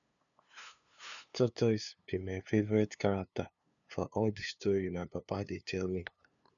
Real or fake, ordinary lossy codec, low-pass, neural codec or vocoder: fake; none; 7.2 kHz; codec, 16 kHz, 2 kbps, FunCodec, trained on LibriTTS, 25 frames a second